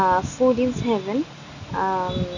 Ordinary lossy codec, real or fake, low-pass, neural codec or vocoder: none; real; 7.2 kHz; none